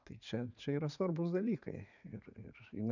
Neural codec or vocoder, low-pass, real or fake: vocoder, 44.1 kHz, 80 mel bands, Vocos; 7.2 kHz; fake